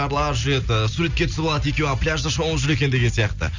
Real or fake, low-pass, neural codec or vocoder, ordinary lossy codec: real; 7.2 kHz; none; Opus, 64 kbps